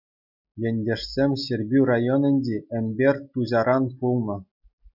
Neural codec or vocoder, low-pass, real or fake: none; 5.4 kHz; real